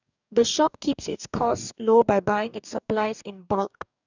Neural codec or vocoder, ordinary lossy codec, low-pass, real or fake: codec, 44.1 kHz, 2.6 kbps, DAC; none; 7.2 kHz; fake